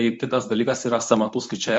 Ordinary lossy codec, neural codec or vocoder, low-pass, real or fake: MP3, 48 kbps; codec, 24 kHz, 0.9 kbps, WavTokenizer, medium speech release version 1; 10.8 kHz; fake